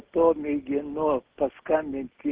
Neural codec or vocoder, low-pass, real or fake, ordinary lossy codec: none; 3.6 kHz; real; Opus, 16 kbps